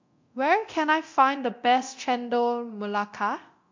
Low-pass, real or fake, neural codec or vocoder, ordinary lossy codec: 7.2 kHz; fake; codec, 24 kHz, 0.9 kbps, DualCodec; MP3, 48 kbps